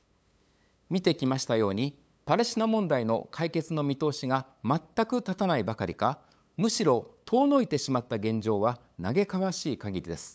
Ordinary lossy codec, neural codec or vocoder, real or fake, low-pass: none; codec, 16 kHz, 8 kbps, FunCodec, trained on LibriTTS, 25 frames a second; fake; none